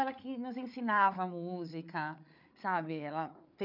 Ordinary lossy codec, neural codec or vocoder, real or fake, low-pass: none; codec, 16 kHz, 8 kbps, FreqCodec, larger model; fake; 5.4 kHz